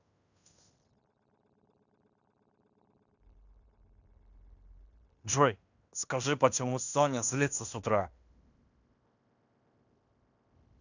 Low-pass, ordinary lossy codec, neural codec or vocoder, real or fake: 7.2 kHz; none; codec, 16 kHz in and 24 kHz out, 0.9 kbps, LongCat-Audio-Codec, fine tuned four codebook decoder; fake